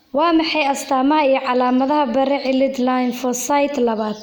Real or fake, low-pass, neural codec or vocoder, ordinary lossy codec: real; none; none; none